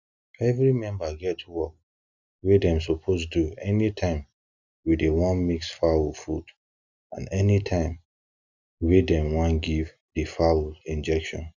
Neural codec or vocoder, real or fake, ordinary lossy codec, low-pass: none; real; none; 7.2 kHz